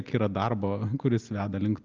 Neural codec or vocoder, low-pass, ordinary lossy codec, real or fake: none; 7.2 kHz; Opus, 24 kbps; real